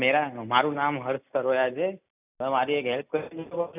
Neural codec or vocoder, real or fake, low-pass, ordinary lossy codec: none; real; 3.6 kHz; none